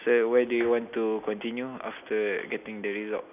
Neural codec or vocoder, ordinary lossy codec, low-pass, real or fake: none; none; 3.6 kHz; real